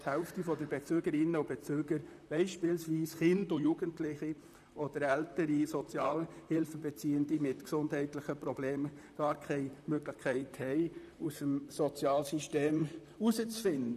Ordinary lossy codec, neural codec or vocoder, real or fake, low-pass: none; vocoder, 44.1 kHz, 128 mel bands, Pupu-Vocoder; fake; 14.4 kHz